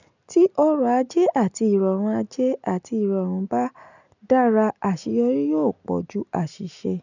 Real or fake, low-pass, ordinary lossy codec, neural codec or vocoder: real; 7.2 kHz; none; none